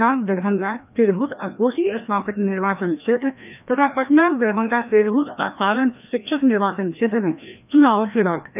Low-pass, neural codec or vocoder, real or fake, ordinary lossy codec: 3.6 kHz; codec, 16 kHz, 1 kbps, FreqCodec, larger model; fake; none